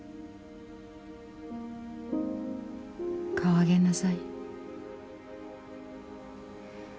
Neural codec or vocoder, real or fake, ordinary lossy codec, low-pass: none; real; none; none